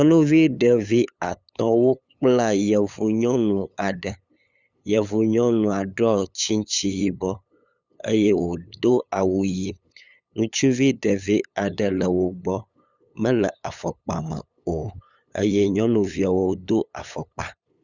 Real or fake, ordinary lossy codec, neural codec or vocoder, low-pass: fake; Opus, 64 kbps; codec, 16 kHz, 8 kbps, FunCodec, trained on LibriTTS, 25 frames a second; 7.2 kHz